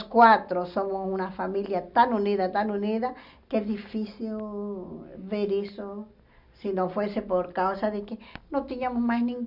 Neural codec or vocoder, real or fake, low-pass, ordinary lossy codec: none; real; 5.4 kHz; none